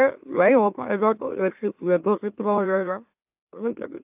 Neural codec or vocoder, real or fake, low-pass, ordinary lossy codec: autoencoder, 44.1 kHz, a latent of 192 numbers a frame, MeloTTS; fake; 3.6 kHz; AAC, 32 kbps